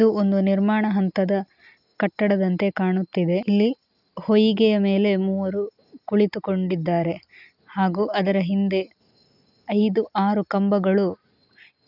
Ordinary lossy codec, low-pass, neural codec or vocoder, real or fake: none; 5.4 kHz; none; real